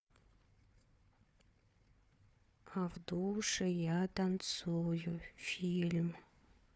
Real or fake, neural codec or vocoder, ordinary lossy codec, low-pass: fake; codec, 16 kHz, 4 kbps, FunCodec, trained on Chinese and English, 50 frames a second; none; none